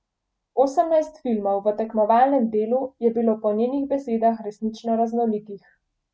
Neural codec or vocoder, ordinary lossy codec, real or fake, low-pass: none; none; real; none